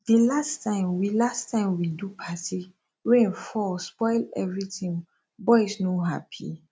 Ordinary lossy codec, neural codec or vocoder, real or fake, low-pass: none; none; real; none